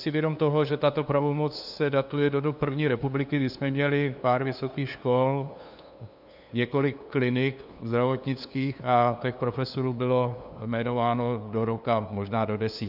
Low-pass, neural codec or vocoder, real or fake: 5.4 kHz; codec, 16 kHz, 2 kbps, FunCodec, trained on LibriTTS, 25 frames a second; fake